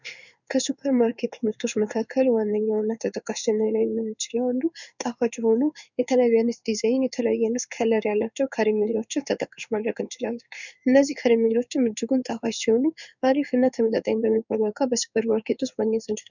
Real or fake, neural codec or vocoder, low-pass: fake; codec, 16 kHz in and 24 kHz out, 1 kbps, XY-Tokenizer; 7.2 kHz